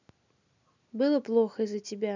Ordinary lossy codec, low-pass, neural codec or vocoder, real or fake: none; 7.2 kHz; none; real